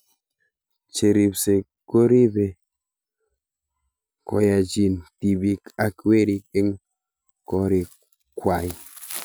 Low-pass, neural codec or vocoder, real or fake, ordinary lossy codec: none; none; real; none